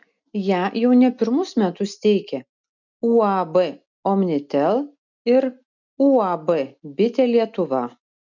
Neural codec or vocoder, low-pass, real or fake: none; 7.2 kHz; real